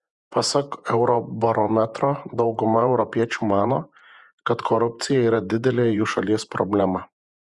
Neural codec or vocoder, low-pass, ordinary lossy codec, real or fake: vocoder, 48 kHz, 128 mel bands, Vocos; 10.8 kHz; Opus, 64 kbps; fake